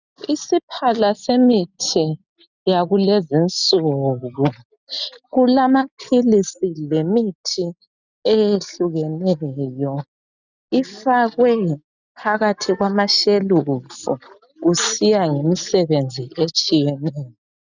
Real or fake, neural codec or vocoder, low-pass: real; none; 7.2 kHz